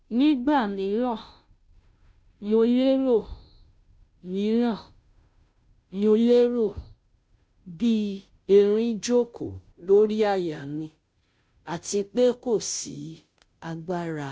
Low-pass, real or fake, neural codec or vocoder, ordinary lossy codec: none; fake; codec, 16 kHz, 0.5 kbps, FunCodec, trained on Chinese and English, 25 frames a second; none